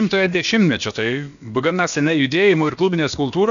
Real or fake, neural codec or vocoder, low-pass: fake; codec, 16 kHz, about 1 kbps, DyCAST, with the encoder's durations; 7.2 kHz